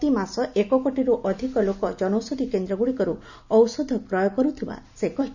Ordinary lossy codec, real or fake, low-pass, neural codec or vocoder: none; real; 7.2 kHz; none